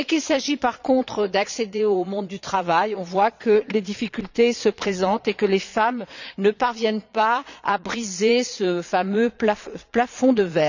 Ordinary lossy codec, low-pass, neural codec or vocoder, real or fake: none; 7.2 kHz; vocoder, 22.05 kHz, 80 mel bands, Vocos; fake